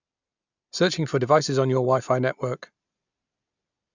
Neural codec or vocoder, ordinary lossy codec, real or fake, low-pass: none; none; real; 7.2 kHz